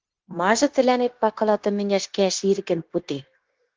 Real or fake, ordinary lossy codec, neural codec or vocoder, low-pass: fake; Opus, 16 kbps; codec, 16 kHz, 0.9 kbps, LongCat-Audio-Codec; 7.2 kHz